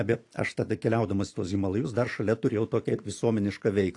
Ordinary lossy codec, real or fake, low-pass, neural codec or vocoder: AAC, 48 kbps; real; 10.8 kHz; none